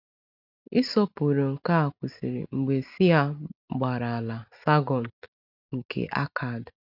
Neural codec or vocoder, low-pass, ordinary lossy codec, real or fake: none; 5.4 kHz; none; real